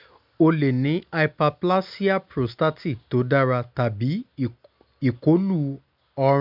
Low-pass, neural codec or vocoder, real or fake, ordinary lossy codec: 5.4 kHz; none; real; none